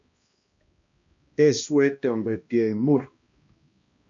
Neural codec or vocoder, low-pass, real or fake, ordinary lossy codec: codec, 16 kHz, 1 kbps, X-Codec, HuBERT features, trained on balanced general audio; 7.2 kHz; fake; AAC, 48 kbps